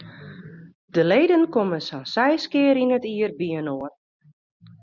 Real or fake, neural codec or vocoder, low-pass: real; none; 7.2 kHz